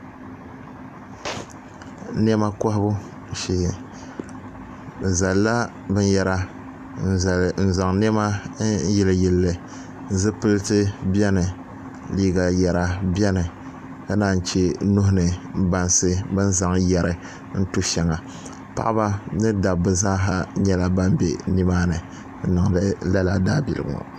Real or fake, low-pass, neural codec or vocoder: real; 14.4 kHz; none